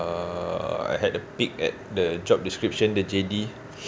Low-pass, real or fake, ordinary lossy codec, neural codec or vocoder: none; real; none; none